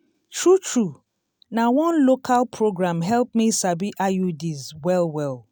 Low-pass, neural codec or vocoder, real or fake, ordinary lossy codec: none; none; real; none